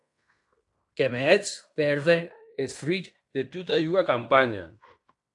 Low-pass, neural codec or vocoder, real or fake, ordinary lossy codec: 10.8 kHz; codec, 16 kHz in and 24 kHz out, 0.9 kbps, LongCat-Audio-Codec, fine tuned four codebook decoder; fake; AAC, 64 kbps